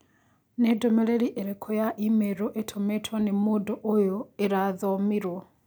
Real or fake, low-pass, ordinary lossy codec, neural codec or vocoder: real; none; none; none